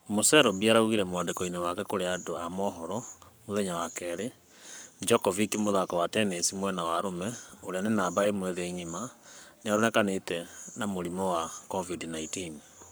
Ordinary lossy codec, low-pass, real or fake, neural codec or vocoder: none; none; fake; codec, 44.1 kHz, 7.8 kbps, Pupu-Codec